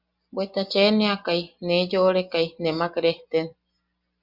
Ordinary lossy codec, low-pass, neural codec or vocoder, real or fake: Opus, 64 kbps; 5.4 kHz; none; real